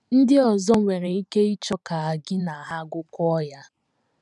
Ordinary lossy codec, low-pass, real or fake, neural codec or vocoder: none; 10.8 kHz; fake; vocoder, 44.1 kHz, 128 mel bands every 512 samples, BigVGAN v2